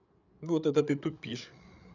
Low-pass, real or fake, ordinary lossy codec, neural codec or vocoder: 7.2 kHz; fake; none; codec, 16 kHz, 8 kbps, FreqCodec, larger model